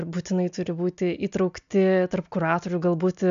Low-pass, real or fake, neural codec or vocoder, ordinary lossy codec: 7.2 kHz; real; none; MP3, 64 kbps